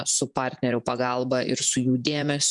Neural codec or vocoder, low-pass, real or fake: vocoder, 48 kHz, 128 mel bands, Vocos; 10.8 kHz; fake